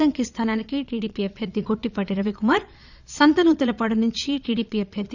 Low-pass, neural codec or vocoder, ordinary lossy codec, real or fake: 7.2 kHz; vocoder, 22.05 kHz, 80 mel bands, Vocos; none; fake